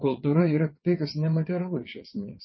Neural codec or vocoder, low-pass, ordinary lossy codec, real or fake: vocoder, 22.05 kHz, 80 mel bands, WaveNeXt; 7.2 kHz; MP3, 24 kbps; fake